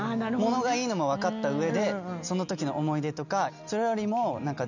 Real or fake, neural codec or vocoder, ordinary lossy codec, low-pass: real; none; none; 7.2 kHz